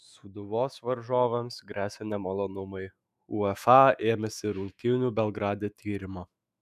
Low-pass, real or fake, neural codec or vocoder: 14.4 kHz; fake; autoencoder, 48 kHz, 128 numbers a frame, DAC-VAE, trained on Japanese speech